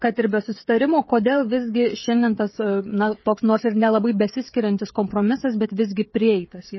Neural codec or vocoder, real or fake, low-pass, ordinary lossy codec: codec, 16 kHz, 16 kbps, FreqCodec, smaller model; fake; 7.2 kHz; MP3, 24 kbps